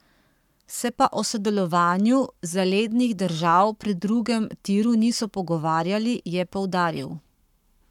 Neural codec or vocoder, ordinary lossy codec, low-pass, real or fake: codec, 44.1 kHz, 7.8 kbps, Pupu-Codec; none; 19.8 kHz; fake